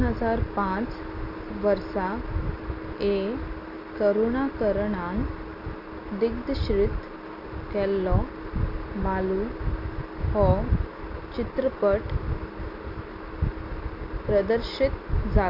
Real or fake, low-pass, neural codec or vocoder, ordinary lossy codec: real; 5.4 kHz; none; Opus, 64 kbps